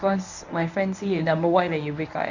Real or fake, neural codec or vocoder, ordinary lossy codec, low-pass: fake; codec, 24 kHz, 0.9 kbps, WavTokenizer, medium speech release version 1; none; 7.2 kHz